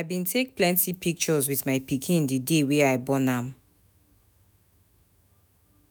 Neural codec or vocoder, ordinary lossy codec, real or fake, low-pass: autoencoder, 48 kHz, 128 numbers a frame, DAC-VAE, trained on Japanese speech; none; fake; none